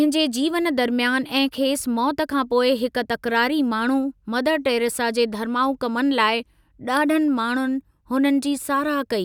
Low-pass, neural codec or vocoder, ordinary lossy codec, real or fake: 19.8 kHz; none; none; real